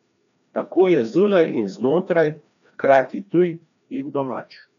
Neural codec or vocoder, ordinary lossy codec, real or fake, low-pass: codec, 16 kHz, 1 kbps, FreqCodec, larger model; none; fake; 7.2 kHz